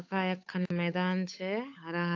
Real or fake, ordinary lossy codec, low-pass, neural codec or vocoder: fake; AAC, 48 kbps; 7.2 kHz; codec, 16 kHz, 8 kbps, FunCodec, trained on Chinese and English, 25 frames a second